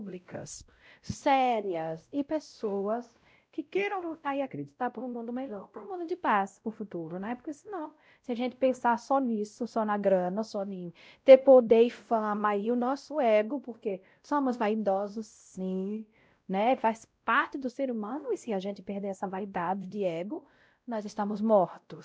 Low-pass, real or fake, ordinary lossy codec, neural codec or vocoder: none; fake; none; codec, 16 kHz, 0.5 kbps, X-Codec, WavLM features, trained on Multilingual LibriSpeech